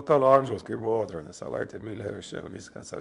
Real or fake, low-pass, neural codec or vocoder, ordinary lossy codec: fake; 10.8 kHz; codec, 24 kHz, 0.9 kbps, WavTokenizer, small release; MP3, 64 kbps